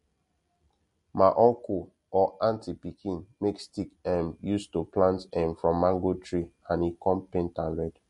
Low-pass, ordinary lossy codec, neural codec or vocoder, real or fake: 14.4 kHz; MP3, 48 kbps; none; real